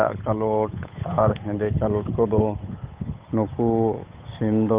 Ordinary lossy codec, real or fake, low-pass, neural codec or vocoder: Opus, 32 kbps; fake; 3.6 kHz; codec, 16 kHz, 8 kbps, FunCodec, trained on Chinese and English, 25 frames a second